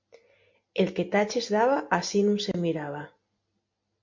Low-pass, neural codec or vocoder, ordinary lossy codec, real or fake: 7.2 kHz; none; MP3, 48 kbps; real